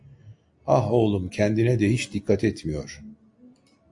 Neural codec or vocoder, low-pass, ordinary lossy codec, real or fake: none; 10.8 kHz; AAC, 64 kbps; real